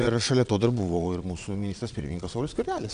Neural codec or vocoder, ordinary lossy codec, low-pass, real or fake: vocoder, 22.05 kHz, 80 mel bands, Vocos; MP3, 96 kbps; 9.9 kHz; fake